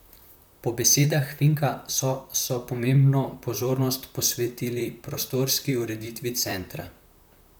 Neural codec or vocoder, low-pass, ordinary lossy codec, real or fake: vocoder, 44.1 kHz, 128 mel bands, Pupu-Vocoder; none; none; fake